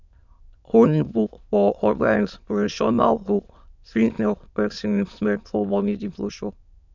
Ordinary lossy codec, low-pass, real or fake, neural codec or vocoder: none; 7.2 kHz; fake; autoencoder, 22.05 kHz, a latent of 192 numbers a frame, VITS, trained on many speakers